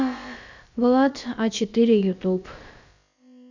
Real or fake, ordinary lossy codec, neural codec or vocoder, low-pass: fake; none; codec, 16 kHz, about 1 kbps, DyCAST, with the encoder's durations; 7.2 kHz